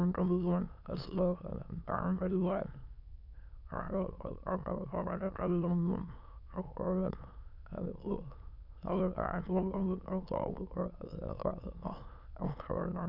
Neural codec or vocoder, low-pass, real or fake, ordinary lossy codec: autoencoder, 22.05 kHz, a latent of 192 numbers a frame, VITS, trained on many speakers; 5.4 kHz; fake; none